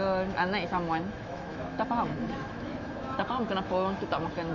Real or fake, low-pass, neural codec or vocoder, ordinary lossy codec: fake; 7.2 kHz; autoencoder, 48 kHz, 128 numbers a frame, DAC-VAE, trained on Japanese speech; none